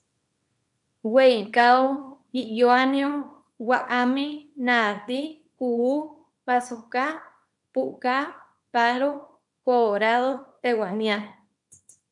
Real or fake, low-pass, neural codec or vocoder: fake; 10.8 kHz; codec, 24 kHz, 0.9 kbps, WavTokenizer, small release